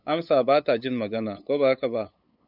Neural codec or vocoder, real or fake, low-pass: codec, 16 kHz, 8 kbps, FunCodec, trained on LibriTTS, 25 frames a second; fake; 5.4 kHz